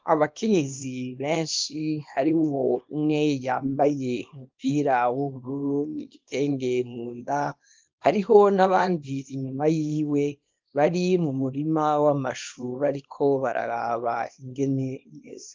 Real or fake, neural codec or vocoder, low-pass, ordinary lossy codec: fake; codec, 24 kHz, 0.9 kbps, WavTokenizer, small release; 7.2 kHz; Opus, 24 kbps